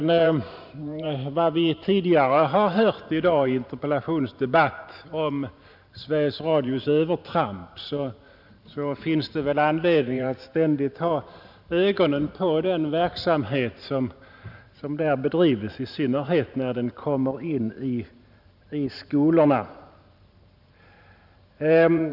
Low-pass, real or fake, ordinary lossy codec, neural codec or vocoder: 5.4 kHz; fake; none; vocoder, 44.1 kHz, 128 mel bands every 512 samples, BigVGAN v2